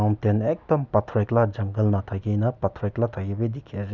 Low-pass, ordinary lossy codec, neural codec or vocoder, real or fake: 7.2 kHz; none; none; real